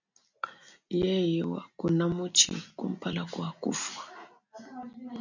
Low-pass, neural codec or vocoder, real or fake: 7.2 kHz; none; real